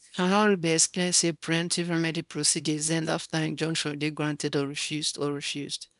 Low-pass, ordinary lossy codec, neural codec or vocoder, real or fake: 10.8 kHz; none; codec, 24 kHz, 0.9 kbps, WavTokenizer, small release; fake